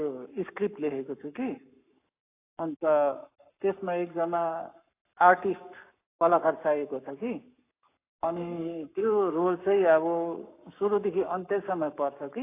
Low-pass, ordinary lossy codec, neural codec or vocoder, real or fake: 3.6 kHz; AAC, 24 kbps; codec, 44.1 kHz, 7.8 kbps, Pupu-Codec; fake